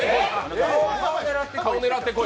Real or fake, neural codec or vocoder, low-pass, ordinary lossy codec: real; none; none; none